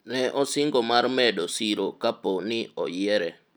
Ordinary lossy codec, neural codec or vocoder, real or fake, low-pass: none; none; real; none